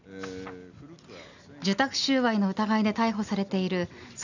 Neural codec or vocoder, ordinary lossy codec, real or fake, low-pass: none; none; real; 7.2 kHz